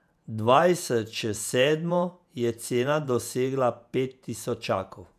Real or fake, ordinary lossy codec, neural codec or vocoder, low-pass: fake; none; vocoder, 48 kHz, 128 mel bands, Vocos; 14.4 kHz